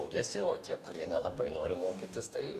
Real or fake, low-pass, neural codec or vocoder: fake; 14.4 kHz; codec, 44.1 kHz, 2.6 kbps, DAC